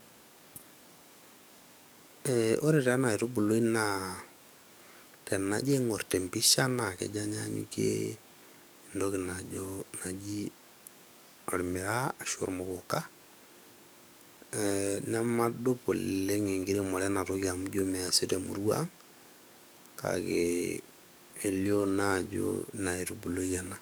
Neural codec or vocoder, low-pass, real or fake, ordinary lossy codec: codec, 44.1 kHz, 7.8 kbps, DAC; none; fake; none